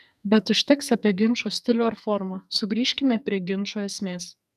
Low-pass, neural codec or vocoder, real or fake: 14.4 kHz; codec, 44.1 kHz, 2.6 kbps, SNAC; fake